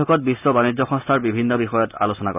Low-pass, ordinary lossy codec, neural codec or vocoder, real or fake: 3.6 kHz; none; none; real